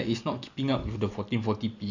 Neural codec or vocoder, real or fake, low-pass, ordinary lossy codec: none; real; 7.2 kHz; none